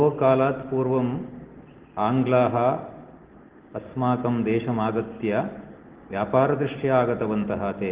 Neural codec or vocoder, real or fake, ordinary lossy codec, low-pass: none; real; Opus, 16 kbps; 3.6 kHz